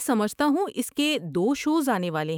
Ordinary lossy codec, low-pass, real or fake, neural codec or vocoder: none; 19.8 kHz; fake; autoencoder, 48 kHz, 128 numbers a frame, DAC-VAE, trained on Japanese speech